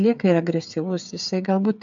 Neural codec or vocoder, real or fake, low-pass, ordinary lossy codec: codec, 16 kHz, 8 kbps, FreqCodec, smaller model; fake; 7.2 kHz; MP3, 64 kbps